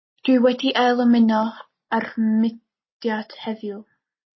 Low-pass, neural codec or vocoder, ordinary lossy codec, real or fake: 7.2 kHz; none; MP3, 24 kbps; real